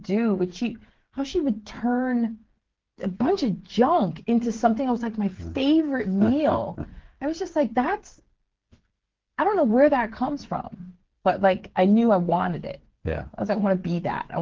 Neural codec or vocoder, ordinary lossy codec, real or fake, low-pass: codec, 16 kHz, 8 kbps, FreqCodec, smaller model; Opus, 16 kbps; fake; 7.2 kHz